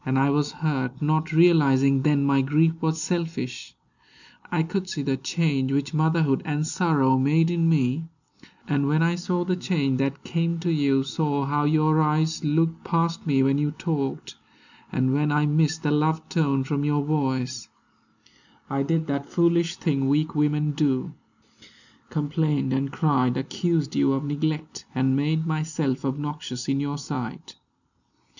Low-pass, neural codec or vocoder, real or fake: 7.2 kHz; none; real